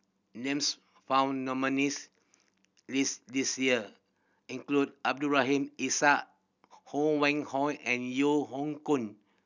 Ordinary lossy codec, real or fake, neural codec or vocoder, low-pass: none; real; none; 7.2 kHz